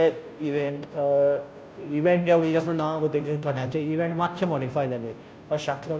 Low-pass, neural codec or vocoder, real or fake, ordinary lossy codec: none; codec, 16 kHz, 0.5 kbps, FunCodec, trained on Chinese and English, 25 frames a second; fake; none